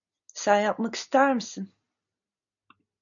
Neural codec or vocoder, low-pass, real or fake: none; 7.2 kHz; real